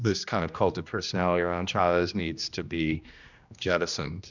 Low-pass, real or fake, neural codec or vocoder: 7.2 kHz; fake; codec, 16 kHz, 1 kbps, X-Codec, HuBERT features, trained on general audio